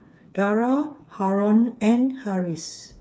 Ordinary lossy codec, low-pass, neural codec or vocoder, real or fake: none; none; codec, 16 kHz, 4 kbps, FreqCodec, smaller model; fake